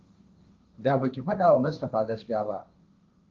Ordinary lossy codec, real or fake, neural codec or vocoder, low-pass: Opus, 24 kbps; fake; codec, 16 kHz, 1.1 kbps, Voila-Tokenizer; 7.2 kHz